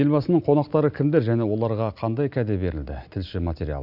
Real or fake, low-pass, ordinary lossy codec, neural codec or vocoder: real; 5.4 kHz; none; none